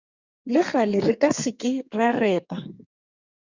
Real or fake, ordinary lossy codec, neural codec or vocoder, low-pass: fake; Opus, 64 kbps; codec, 32 kHz, 1.9 kbps, SNAC; 7.2 kHz